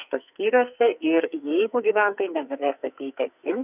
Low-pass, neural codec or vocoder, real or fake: 3.6 kHz; codec, 44.1 kHz, 2.6 kbps, SNAC; fake